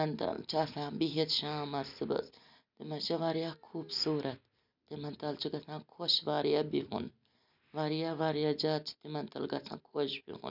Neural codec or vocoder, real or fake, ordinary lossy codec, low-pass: none; real; none; 5.4 kHz